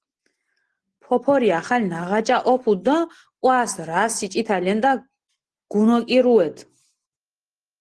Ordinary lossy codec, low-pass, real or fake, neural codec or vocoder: Opus, 16 kbps; 10.8 kHz; real; none